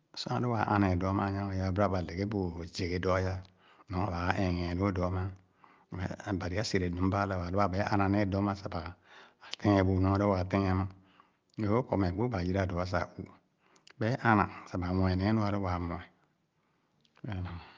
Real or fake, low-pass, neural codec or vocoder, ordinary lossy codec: real; 7.2 kHz; none; Opus, 24 kbps